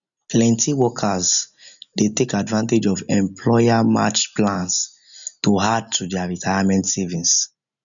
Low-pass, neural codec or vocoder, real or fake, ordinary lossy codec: 7.2 kHz; none; real; none